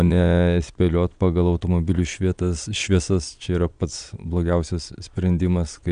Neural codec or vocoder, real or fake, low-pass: vocoder, 22.05 kHz, 80 mel bands, Vocos; fake; 9.9 kHz